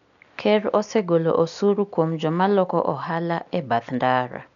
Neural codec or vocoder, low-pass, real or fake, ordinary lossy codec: none; 7.2 kHz; real; none